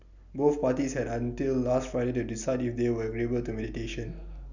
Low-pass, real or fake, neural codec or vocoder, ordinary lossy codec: 7.2 kHz; real; none; none